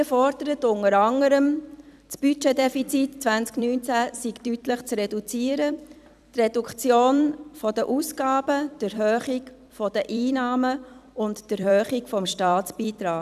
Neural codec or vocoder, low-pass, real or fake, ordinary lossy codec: vocoder, 44.1 kHz, 128 mel bands every 256 samples, BigVGAN v2; 14.4 kHz; fake; none